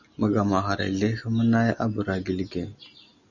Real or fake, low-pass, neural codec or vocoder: real; 7.2 kHz; none